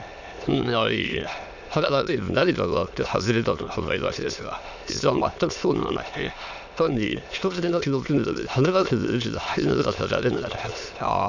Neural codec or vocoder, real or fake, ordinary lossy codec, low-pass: autoencoder, 22.05 kHz, a latent of 192 numbers a frame, VITS, trained on many speakers; fake; Opus, 64 kbps; 7.2 kHz